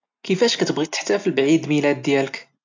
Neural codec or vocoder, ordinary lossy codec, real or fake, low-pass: none; AAC, 48 kbps; real; 7.2 kHz